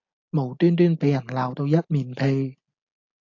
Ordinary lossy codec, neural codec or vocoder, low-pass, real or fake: AAC, 48 kbps; none; 7.2 kHz; real